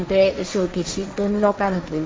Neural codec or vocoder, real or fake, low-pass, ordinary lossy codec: codec, 16 kHz, 1.1 kbps, Voila-Tokenizer; fake; none; none